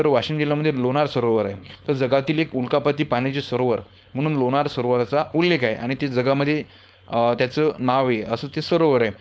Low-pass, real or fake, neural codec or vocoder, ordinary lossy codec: none; fake; codec, 16 kHz, 4.8 kbps, FACodec; none